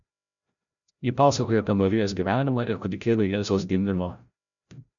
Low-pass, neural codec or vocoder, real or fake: 7.2 kHz; codec, 16 kHz, 0.5 kbps, FreqCodec, larger model; fake